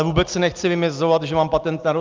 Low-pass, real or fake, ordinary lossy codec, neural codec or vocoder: 7.2 kHz; real; Opus, 24 kbps; none